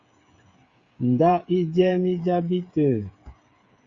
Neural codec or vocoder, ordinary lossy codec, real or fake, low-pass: codec, 16 kHz, 8 kbps, FreqCodec, smaller model; Opus, 64 kbps; fake; 7.2 kHz